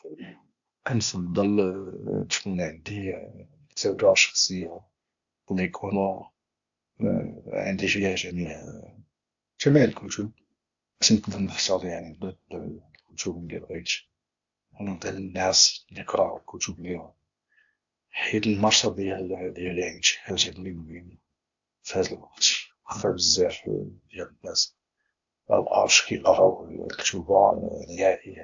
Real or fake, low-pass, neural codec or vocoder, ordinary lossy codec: fake; 7.2 kHz; codec, 16 kHz, 0.8 kbps, ZipCodec; AAC, 64 kbps